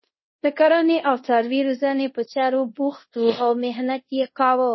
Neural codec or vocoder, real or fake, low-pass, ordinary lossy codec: codec, 24 kHz, 0.5 kbps, DualCodec; fake; 7.2 kHz; MP3, 24 kbps